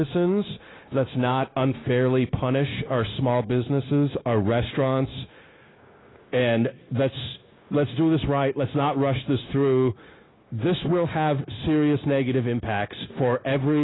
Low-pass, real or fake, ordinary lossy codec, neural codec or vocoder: 7.2 kHz; fake; AAC, 16 kbps; codec, 16 kHz, 0.9 kbps, LongCat-Audio-Codec